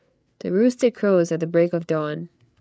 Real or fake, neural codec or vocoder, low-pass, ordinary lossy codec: fake; codec, 16 kHz, 8 kbps, FreqCodec, larger model; none; none